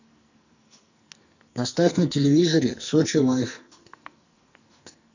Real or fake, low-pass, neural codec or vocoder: fake; 7.2 kHz; codec, 44.1 kHz, 2.6 kbps, SNAC